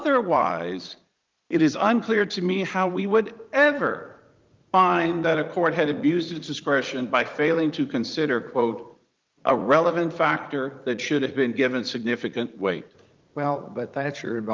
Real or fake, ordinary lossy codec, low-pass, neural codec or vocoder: fake; Opus, 32 kbps; 7.2 kHz; vocoder, 44.1 kHz, 80 mel bands, Vocos